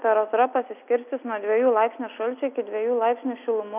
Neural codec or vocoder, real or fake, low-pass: none; real; 3.6 kHz